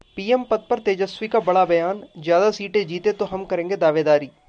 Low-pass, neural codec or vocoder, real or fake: 9.9 kHz; none; real